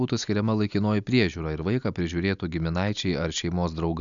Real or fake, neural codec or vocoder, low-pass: real; none; 7.2 kHz